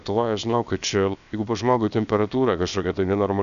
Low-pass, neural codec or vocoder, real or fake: 7.2 kHz; codec, 16 kHz, about 1 kbps, DyCAST, with the encoder's durations; fake